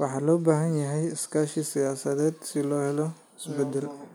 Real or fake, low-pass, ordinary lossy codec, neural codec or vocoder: real; none; none; none